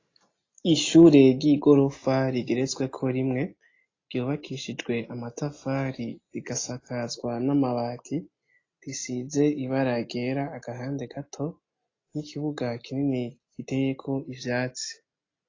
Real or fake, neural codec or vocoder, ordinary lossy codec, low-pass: real; none; AAC, 32 kbps; 7.2 kHz